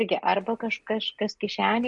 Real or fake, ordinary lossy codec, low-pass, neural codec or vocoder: real; MP3, 48 kbps; 10.8 kHz; none